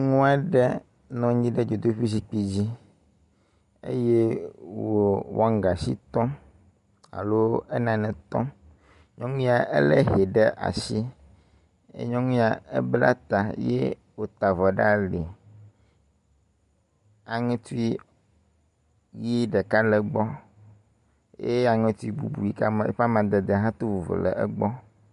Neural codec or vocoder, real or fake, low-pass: none; real; 10.8 kHz